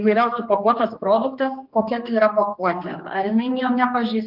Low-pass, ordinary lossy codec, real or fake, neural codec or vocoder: 5.4 kHz; Opus, 24 kbps; fake; codec, 16 kHz, 2 kbps, X-Codec, HuBERT features, trained on general audio